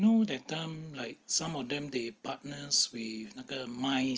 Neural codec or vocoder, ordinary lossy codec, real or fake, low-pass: none; Opus, 24 kbps; real; 7.2 kHz